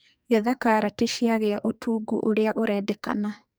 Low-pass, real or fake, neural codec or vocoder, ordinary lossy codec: none; fake; codec, 44.1 kHz, 2.6 kbps, SNAC; none